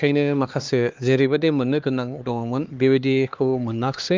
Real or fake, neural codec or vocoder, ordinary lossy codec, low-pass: fake; codec, 16 kHz, 2 kbps, X-Codec, HuBERT features, trained on LibriSpeech; Opus, 24 kbps; 7.2 kHz